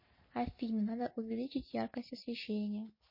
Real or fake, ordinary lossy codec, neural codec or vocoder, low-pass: real; MP3, 24 kbps; none; 5.4 kHz